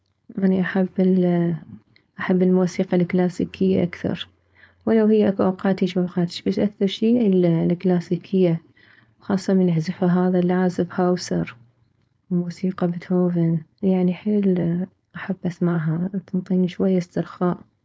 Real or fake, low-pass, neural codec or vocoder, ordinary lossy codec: fake; none; codec, 16 kHz, 4.8 kbps, FACodec; none